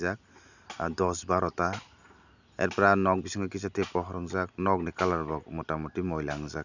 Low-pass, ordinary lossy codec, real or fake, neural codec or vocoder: 7.2 kHz; none; real; none